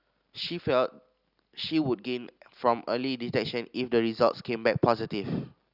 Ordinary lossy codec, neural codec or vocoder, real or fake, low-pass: Opus, 64 kbps; none; real; 5.4 kHz